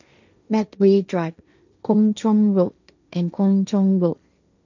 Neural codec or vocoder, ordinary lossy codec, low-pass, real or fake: codec, 16 kHz, 1.1 kbps, Voila-Tokenizer; none; 7.2 kHz; fake